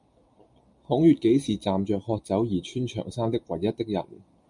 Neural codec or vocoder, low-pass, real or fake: none; 10.8 kHz; real